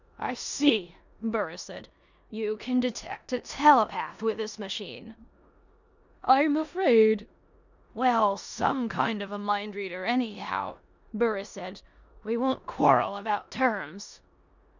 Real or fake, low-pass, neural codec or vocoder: fake; 7.2 kHz; codec, 16 kHz in and 24 kHz out, 0.9 kbps, LongCat-Audio-Codec, four codebook decoder